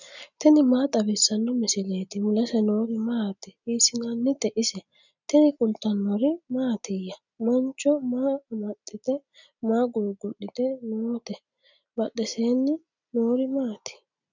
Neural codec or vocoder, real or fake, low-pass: none; real; 7.2 kHz